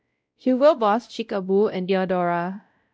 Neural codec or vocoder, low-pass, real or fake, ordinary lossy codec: codec, 16 kHz, 0.5 kbps, X-Codec, WavLM features, trained on Multilingual LibriSpeech; none; fake; none